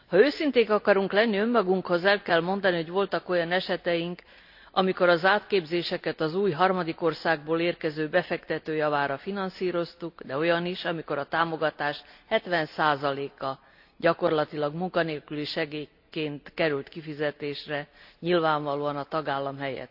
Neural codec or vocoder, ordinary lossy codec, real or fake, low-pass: none; AAC, 48 kbps; real; 5.4 kHz